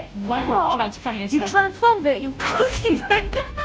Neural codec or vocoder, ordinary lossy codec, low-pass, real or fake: codec, 16 kHz, 0.5 kbps, FunCodec, trained on Chinese and English, 25 frames a second; none; none; fake